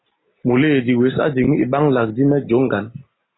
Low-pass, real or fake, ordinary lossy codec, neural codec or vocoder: 7.2 kHz; real; AAC, 16 kbps; none